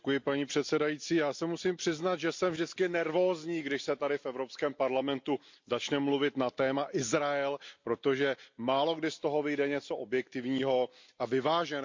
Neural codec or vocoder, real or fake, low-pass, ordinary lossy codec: none; real; 7.2 kHz; MP3, 48 kbps